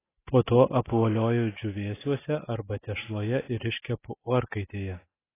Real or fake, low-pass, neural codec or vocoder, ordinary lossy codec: real; 3.6 kHz; none; AAC, 16 kbps